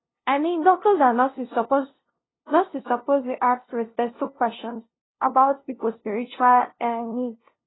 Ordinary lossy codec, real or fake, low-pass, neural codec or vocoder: AAC, 16 kbps; fake; 7.2 kHz; codec, 16 kHz, 0.5 kbps, FunCodec, trained on LibriTTS, 25 frames a second